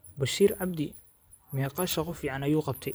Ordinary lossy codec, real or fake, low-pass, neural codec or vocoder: none; fake; none; vocoder, 44.1 kHz, 128 mel bands every 512 samples, BigVGAN v2